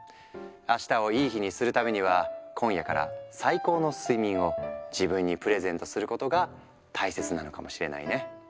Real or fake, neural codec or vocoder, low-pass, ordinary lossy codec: real; none; none; none